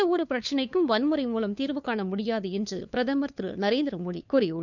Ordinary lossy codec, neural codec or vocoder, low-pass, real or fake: none; codec, 16 kHz, 2 kbps, FunCodec, trained on LibriTTS, 25 frames a second; 7.2 kHz; fake